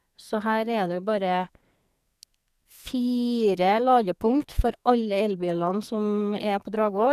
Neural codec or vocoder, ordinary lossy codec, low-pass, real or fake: codec, 44.1 kHz, 2.6 kbps, SNAC; none; 14.4 kHz; fake